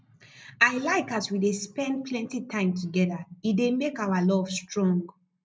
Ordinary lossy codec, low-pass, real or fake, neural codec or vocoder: none; none; real; none